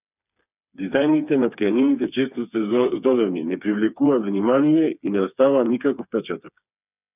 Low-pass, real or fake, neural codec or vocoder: 3.6 kHz; fake; codec, 16 kHz, 4 kbps, FreqCodec, smaller model